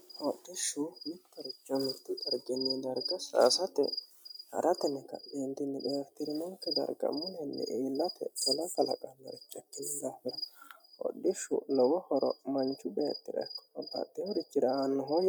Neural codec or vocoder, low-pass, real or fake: none; 19.8 kHz; real